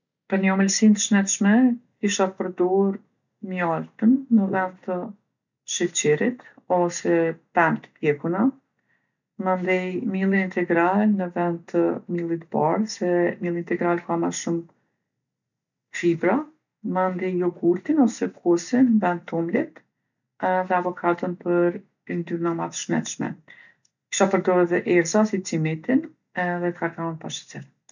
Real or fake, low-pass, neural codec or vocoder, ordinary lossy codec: real; 7.2 kHz; none; none